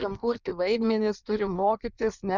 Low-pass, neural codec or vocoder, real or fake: 7.2 kHz; codec, 16 kHz in and 24 kHz out, 1.1 kbps, FireRedTTS-2 codec; fake